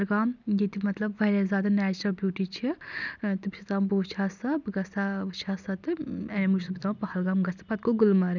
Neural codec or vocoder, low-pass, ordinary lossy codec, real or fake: none; 7.2 kHz; none; real